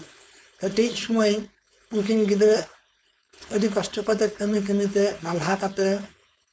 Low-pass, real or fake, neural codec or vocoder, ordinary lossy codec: none; fake; codec, 16 kHz, 4.8 kbps, FACodec; none